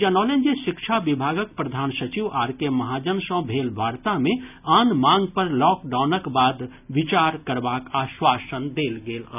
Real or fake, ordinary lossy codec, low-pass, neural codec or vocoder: real; none; 3.6 kHz; none